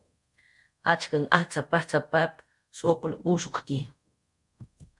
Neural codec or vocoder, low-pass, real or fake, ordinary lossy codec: codec, 24 kHz, 0.5 kbps, DualCodec; 10.8 kHz; fake; MP3, 64 kbps